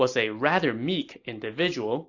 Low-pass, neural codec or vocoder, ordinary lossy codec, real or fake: 7.2 kHz; none; AAC, 48 kbps; real